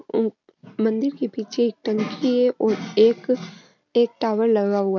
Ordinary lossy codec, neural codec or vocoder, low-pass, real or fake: none; none; 7.2 kHz; real